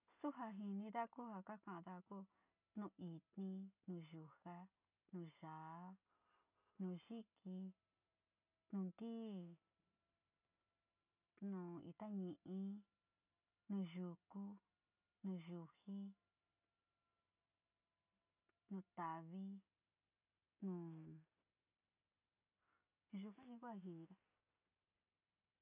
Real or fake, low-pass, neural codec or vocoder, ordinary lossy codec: real; 3.6 kHz; none; none